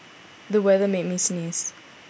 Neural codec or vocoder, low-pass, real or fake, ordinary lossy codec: none; none; real; none